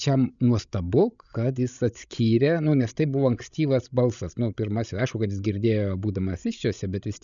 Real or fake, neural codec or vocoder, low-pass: fake; codec, 16 kHz, 16 kbps, FreqCodec, larger model; 7.2 kHz